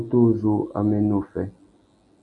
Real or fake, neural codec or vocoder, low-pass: real; none; 10.8 kHz